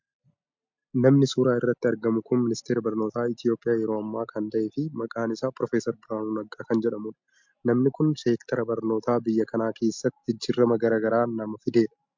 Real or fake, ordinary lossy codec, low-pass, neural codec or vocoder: real; MP3, 64 kbps; 7.2 kHz; none